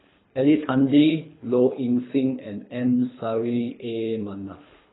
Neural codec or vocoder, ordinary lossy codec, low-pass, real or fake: codec, 24 kHz, 3 kbps, HILCodec; AAC, 16 kbps; 7.2 kHz; fake